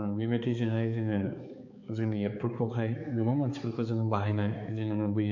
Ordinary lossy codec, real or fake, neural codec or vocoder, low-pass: MP3, 48 kbps; fake; codec, 16 kHz, 4 kbps, X-Codec, HuBERT features, trained on balanced general audio; 7.2 kHz